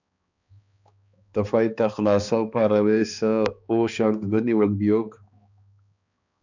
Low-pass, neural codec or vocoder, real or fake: 7.2 kHz; codec, 16 kHz, 2 kbps, X-Codec, HuBERT features, trained on balanced general audio; fake